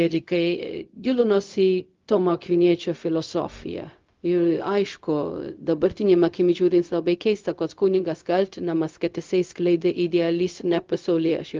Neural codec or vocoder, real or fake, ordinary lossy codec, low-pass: codec, 16 kHz, 0.4 kbps, LongCat-Audio-Codec; fake; Opus, 32 kbps; 7.2 kHz